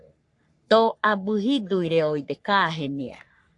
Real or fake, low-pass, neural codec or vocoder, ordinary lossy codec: fake; 10.8 kHz; codec, 44.1 kHz, 3.4 kbps, Pupu-Codec; AAC, 64 kbps